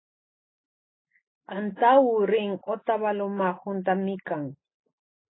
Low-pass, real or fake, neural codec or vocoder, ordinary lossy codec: 7.2 kHz; real; none; AAC, 16 kbps